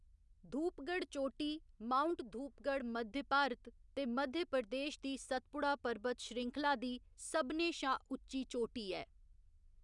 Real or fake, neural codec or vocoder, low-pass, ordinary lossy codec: real; none; 10.8 kHz; none